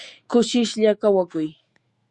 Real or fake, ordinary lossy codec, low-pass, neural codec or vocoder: fake; Opus, 64 kbps; 10.8 kHz; autoencoder, 48 kHz, 128 numbers a frame, DAC-VAE, trained on Japanese speech